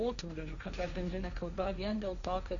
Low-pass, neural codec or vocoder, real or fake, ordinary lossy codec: 7.2 kHz; codec, 16 kHz, 1.1 kbps, Voila-Tokenizer; fake; Opus, 64 kbps